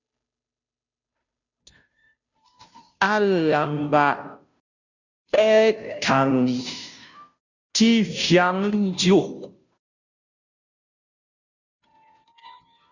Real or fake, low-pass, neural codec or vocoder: fake; 7.2 kHz; codec, 16 kHz, 0.5 kbps, FunCodec, trained on Chinese and English, 25 frames a second